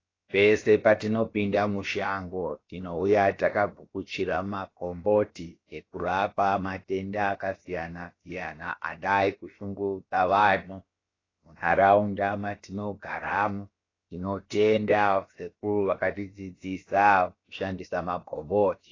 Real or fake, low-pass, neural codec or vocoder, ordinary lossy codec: fake; 7.2 kHz; codec, 16 kHz, about 1 kbps, DyCAST, with the encoder's durations; AAC, 32 kbps